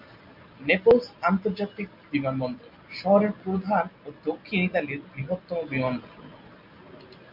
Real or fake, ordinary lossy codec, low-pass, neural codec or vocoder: real; MP3, 48 kbps; 5.4 kHz; none